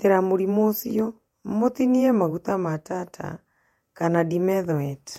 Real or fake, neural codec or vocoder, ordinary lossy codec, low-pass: fake; vocoder, 48 kHz, 128 mel bands, Vocos; MP3, 64 kbps; 19.8 kHz